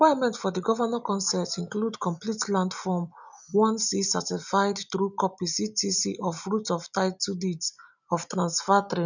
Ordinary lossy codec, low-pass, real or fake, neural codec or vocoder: none; 7.2 kHz; real; none